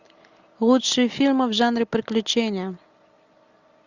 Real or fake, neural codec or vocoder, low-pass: real; none; 7.2 kHz